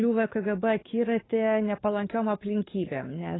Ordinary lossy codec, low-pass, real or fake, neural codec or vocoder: AAC, 16 kbps; 7.2 kHz; fake; codec, 44.1 kHz, 7.8 kbps, DAC